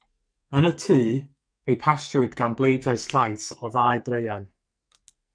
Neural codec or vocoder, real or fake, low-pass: codec, 44.1 kHz, 2.6 kbps, SNAC; fake; 9.9 kHz